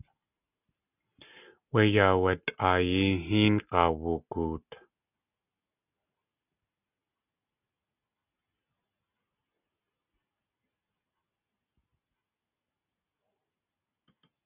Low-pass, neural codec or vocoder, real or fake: 3.6 kHz; none; real